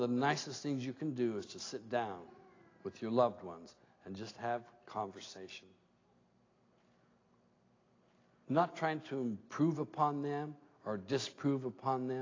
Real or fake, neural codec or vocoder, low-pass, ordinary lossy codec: real; none; 7.2 kHz; AAC, 32 kbps